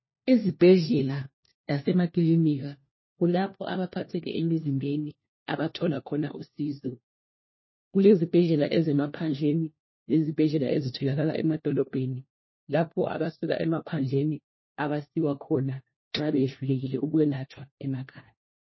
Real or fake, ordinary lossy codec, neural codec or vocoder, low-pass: fake; MP3, 24 kbps; codec, 16 kHz, 1 kbps, FunCodec, trained on LibriTTS, 50 frames a second; 7.2 kHz